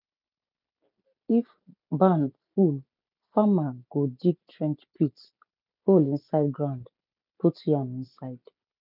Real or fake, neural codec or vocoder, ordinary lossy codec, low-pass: real; none; none; 5.4 kHz